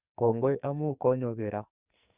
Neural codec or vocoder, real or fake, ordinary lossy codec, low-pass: codec, 44.1 kHz, 2.6 kbps, SNAC; fake; Opus, 64 kbps; 3.6 kHz